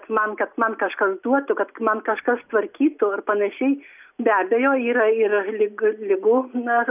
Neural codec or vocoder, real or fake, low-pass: none; real; 3.6 kHz